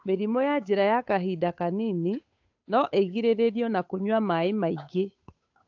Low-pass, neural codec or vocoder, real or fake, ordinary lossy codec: 7.2 kHz; codec, 16 kHz, 8 kbps, FunCodec, trained on Chinese and English, 25 frames a second; fake; AAC, 48 kbps